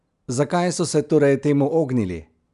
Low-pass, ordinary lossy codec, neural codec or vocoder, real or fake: 10.8 kHz; none; none; real